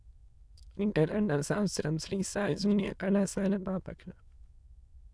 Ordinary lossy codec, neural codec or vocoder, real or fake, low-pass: none; autoencoder, 22.05 kHz, a latent of 192 numbers a frame, VITS, trained on many speakers; fake; none